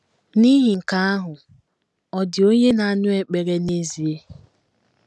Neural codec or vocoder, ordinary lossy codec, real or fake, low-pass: none; none; real; none